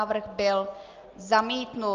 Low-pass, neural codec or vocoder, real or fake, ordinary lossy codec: 7.2 kHz; none; real; Opus, 24 kbps